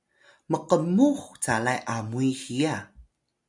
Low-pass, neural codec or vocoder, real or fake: 10.8 kHz; none; real